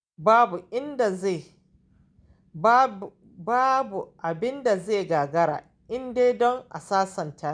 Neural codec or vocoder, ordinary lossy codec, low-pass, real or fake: none; none; 9.9 kHz; real